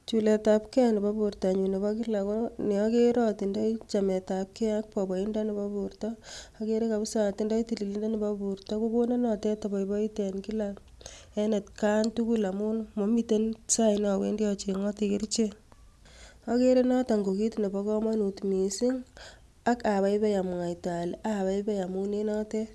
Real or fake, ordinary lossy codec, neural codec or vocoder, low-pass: real; none; none; none